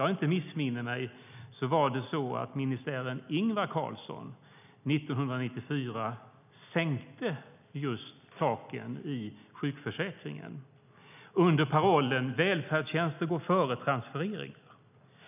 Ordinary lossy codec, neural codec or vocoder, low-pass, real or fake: none; none; 3.6 kHz; real